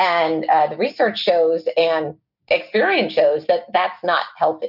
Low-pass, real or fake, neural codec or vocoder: 5.4 kHz; real; none